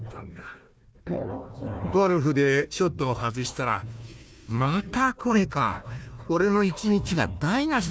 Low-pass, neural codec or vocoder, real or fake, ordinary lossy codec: none; codec, 16 kHz, 1 kbps, FunCodec, trained on Chinese and English, 50 frames a second; fake; none